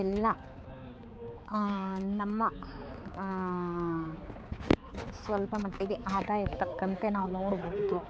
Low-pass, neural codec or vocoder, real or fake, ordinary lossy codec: none; codec, 16 kHz, 4 kbps, X-Codec, HuBERT features, trained on balanced general audio; fake; none